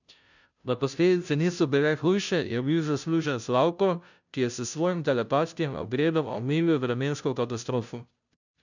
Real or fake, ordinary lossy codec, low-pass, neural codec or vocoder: fake; none; 7.2 kHz; codec, 16 kHz, 0.5 kbps, FunCodec, trained on Chinese and English, 25 frames a second